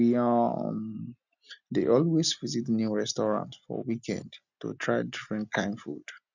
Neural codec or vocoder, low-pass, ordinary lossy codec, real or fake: none; 7.2 kHz; none; real